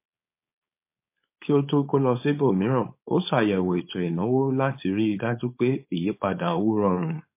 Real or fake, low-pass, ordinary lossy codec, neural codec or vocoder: fake; 3.6 kHz; MP3, 24 kbps; codec, 16 kHz, 4.8 kbps, FACodec